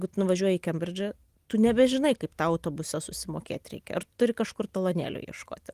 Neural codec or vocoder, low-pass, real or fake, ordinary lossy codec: none; 14.4 kHz; real; Opus, 24 kbps